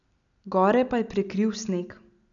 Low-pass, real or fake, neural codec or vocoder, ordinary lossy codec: 7.2 kHz; real; none; none